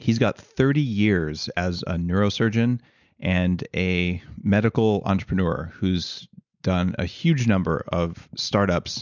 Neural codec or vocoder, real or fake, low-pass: none; real; 7.2 kHz